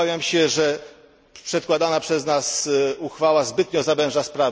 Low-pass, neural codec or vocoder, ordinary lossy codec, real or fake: none; none; none; real